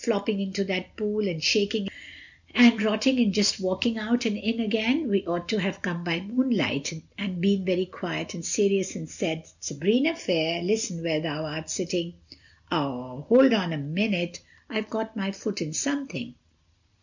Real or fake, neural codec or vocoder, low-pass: real; none; 7.2 kHz